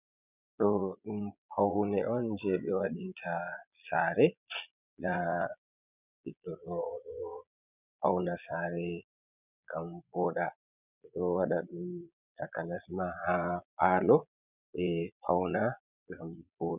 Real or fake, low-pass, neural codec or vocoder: fake; 3.6 kHz; vocoder, 22.05 kHz, 80 mel bands, Vocos